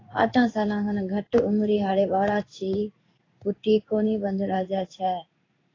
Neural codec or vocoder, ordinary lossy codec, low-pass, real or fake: codec, 16 kHz in and 24 kHz out, 1 kbps, XY-Tokenizer; AAC, 32 kbps; 7.2 kHz; fake